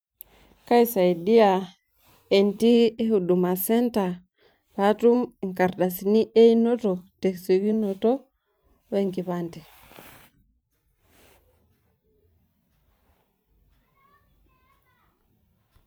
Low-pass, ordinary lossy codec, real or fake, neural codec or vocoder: none; none; fake; vocoder, 44.1 kHz, 128 mel bands every 512 samples, BigVGAN v2